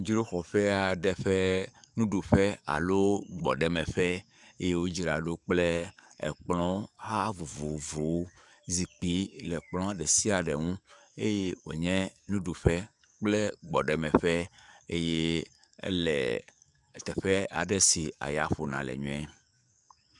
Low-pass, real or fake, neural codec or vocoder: 10.8 kHz; fake; codec, 44.1 kHz, 7.8 kbps, DAC